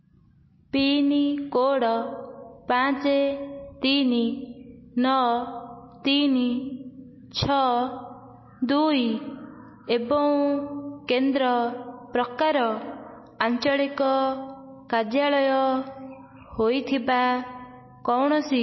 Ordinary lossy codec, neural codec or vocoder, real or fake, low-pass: MP3, 24 kbps; none; real; 7.2 kHz